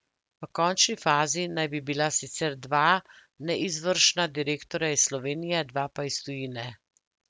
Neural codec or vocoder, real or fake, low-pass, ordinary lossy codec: none; real; none; none